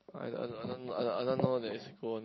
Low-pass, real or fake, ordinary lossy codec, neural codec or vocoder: 7.2 kHz; real; MP3, 24 kbps; none